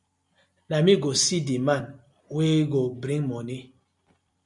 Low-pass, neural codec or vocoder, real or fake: 10.8 kHz; none; real